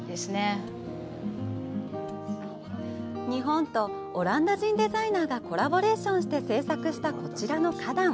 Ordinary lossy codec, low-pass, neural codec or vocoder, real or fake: none; none; none; real